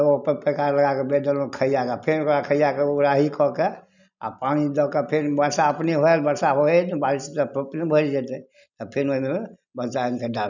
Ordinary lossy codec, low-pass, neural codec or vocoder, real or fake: none; 7.2 kHz; none; real